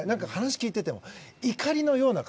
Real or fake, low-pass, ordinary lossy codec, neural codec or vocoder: real; none; none; none